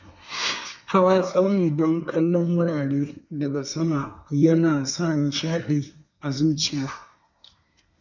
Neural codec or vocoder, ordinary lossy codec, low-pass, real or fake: codec, 24 kHz, 1 kbps, SNAC; none; 7.2 kHz; fake